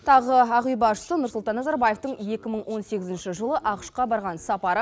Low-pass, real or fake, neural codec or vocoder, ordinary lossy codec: none; real; none; none